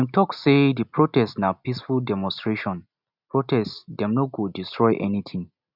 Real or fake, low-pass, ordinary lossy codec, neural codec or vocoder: real; 5.4 kHz; none; none